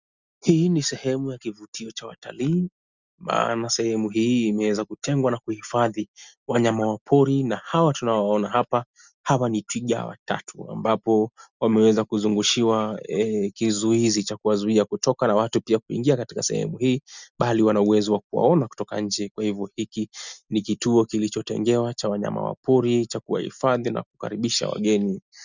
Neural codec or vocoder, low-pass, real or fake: none; 7.2 kHz; real